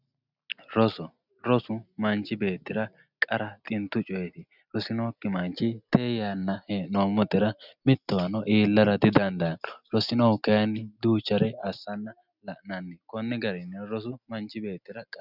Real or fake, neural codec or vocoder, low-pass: real; none; 5.4 kHz